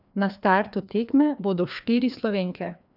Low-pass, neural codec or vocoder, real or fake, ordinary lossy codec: 5.4 kHz; codec, 16 kHz, 2 kbps, FreqCodec, larger model; fake; none